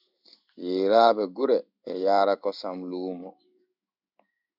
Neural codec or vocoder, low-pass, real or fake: codec, 16 kHz in and 24 kHz out, 1 kbps, XY-Tokenizer; 5.4 kHz; fake